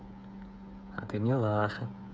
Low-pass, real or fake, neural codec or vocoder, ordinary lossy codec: none; fake; codec, 16 kHz, 8 kbps, FreqCodec, smaller model; none